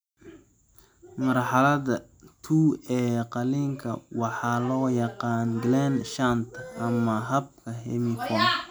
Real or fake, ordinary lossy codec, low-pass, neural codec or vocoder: real; none; none; none